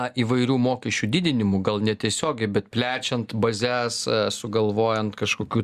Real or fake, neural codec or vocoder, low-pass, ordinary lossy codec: real; none; 14.4 kHz; Opus, 64 kbps